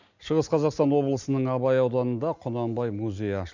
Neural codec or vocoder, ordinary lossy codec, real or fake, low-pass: none; none; real; 7.2 kHz